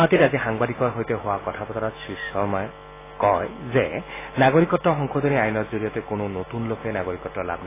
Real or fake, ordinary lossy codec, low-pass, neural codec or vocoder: real; AAC, 16 kbps; 3.6 kHz; none